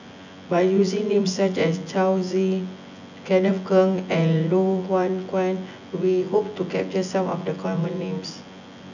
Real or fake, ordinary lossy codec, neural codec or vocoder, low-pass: fake; none; vocoder, 24 kHz, 100 mel bands, Vocos; 7.2 kHz